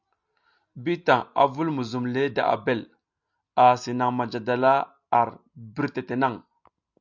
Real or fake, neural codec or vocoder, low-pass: real; none; 7.2 kHz